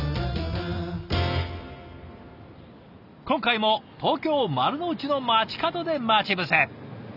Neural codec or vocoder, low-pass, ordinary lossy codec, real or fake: none; 5.4 kHz; none; real